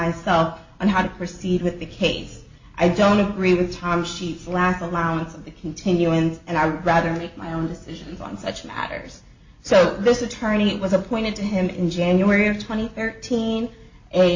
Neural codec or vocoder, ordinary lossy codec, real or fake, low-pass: none; MP3, 32 kbps; real; 7.2 kHz